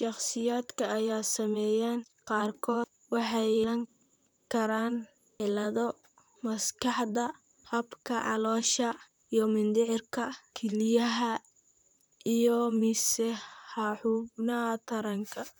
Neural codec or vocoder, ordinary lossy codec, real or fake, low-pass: vocoder, 44.1 kHz, 128 mel bands, Pupu-Vocoder; none; fake; none